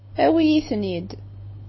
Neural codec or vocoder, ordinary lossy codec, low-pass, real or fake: none; MP3, 24 kbps; 7.2 kHz; real